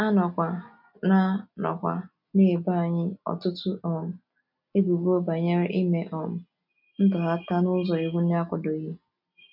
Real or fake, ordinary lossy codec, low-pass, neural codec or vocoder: real; none; 5.4 kHz; none